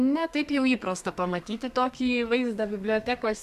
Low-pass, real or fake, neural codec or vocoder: 14.4 kHz; fake; codec, 32 kHz, 1.9 kbps, SNAC